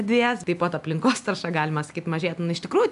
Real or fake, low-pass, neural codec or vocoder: real; 10.8 kHz; none